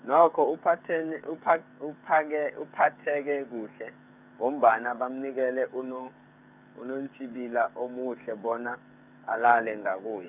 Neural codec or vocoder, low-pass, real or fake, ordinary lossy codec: codec, 16 kHz, 8 kbps, FreqCodec, smaller model; 3.6 kHz; fake; none